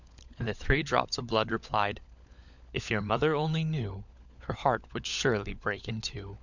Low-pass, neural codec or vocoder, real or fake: 7.2 kHz; codec, 16 kHz, 16 kbps, FunCodec, trained on LibriTTS, 50 frames a second; fake